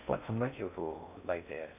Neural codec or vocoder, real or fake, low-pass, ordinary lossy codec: codec, 16 kHz in and 24 kHz out, 0.6 kbps, FocalCodec, streaming, 4096 codes; fake; 3.6 kHz; none